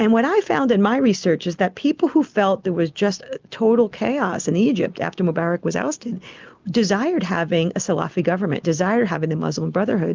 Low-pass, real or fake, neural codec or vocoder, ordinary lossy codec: 7.2 kHz; real; none; Opus, 24 kbps